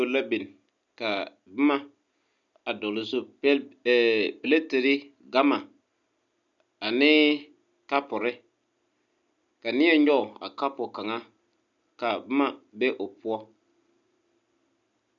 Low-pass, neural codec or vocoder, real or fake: 7.2 kHz; none; real